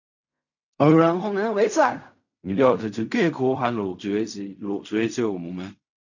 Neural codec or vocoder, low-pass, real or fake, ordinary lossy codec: codec, 16 kHz in and 24 kHz out, 0.4 kbps, LongCat-Audio-Codec, fine tuned four codebook decoder; 7.2 kHz; fake; none